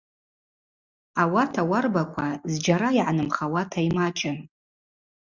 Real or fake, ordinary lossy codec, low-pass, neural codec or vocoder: real; Opus, 64 kbps; 7.2 kHz; none